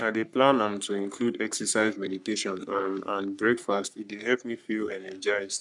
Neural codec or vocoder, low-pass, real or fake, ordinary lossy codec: codec, 44.1 kHz, 3.4 kbps, Pupu-Codec; 10.8 kHz; fake; none